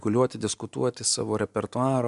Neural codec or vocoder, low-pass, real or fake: none; 10.8 kHz; real